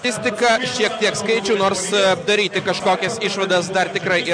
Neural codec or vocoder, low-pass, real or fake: none; 9.9 kHz; real